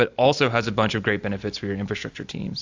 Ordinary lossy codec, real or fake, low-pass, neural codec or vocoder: AAC, 48 kbps; real; 7.2 kHz; none